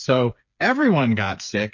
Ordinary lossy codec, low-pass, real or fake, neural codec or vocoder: MP3, 48 kbps; 7.2 kHz; fake; codec, 16 kHz, 4 kbps, FreqCodec, smaller model